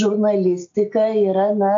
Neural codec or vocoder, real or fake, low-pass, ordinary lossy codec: none; real; 7.2 kHz; AAC, 48 kbps